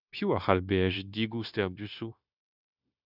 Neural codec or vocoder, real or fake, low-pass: codec, 16 kHz, 0.9 kbps, LongCat-Audio-Codec; fake; 5.4 kHz